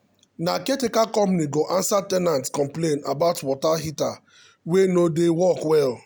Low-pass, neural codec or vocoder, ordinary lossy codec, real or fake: none; none; none; real